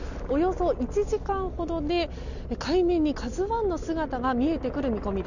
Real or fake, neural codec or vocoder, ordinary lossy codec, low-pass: real; none; none; 7.2 kHz